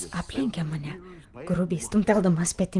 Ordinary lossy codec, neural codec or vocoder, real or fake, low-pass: Opus, 24 kbps; none; real; 10.8 kHz